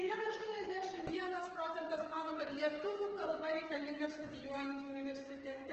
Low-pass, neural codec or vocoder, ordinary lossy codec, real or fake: 7.2 kHz; codec, 16 kHz, 8 kbps, FreqCodec, smaller model; Opus, 16 kbps; fake